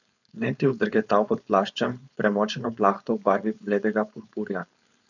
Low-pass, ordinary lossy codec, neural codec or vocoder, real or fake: 7.2 kHz; none; codec, 16 kHz, 4.8 kbps, FACodec; fake